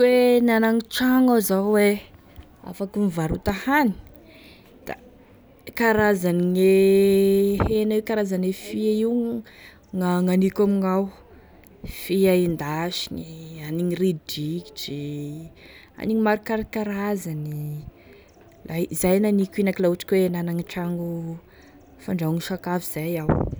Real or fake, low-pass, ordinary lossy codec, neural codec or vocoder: real; none; none; none